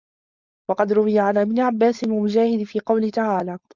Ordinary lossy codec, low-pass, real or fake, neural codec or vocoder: Opus, 64 kbps; 7.2 kHz; fake; codec, 16 kHz, 4.8 kbps, FACodec